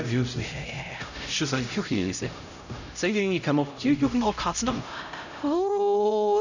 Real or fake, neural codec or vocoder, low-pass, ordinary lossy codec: fake; codec, 16 kHz, 0.5 kbps, X-Codec, HuBERT features, trained on LibriSpeech; 7.2 kHz; none